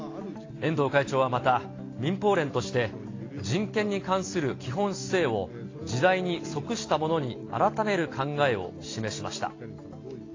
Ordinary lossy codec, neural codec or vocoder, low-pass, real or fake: AAC, 32 kbps; none; 7.2 kHz; real